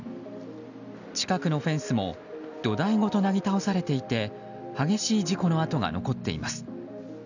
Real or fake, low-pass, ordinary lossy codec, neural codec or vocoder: real; 7.2 kHz; none; none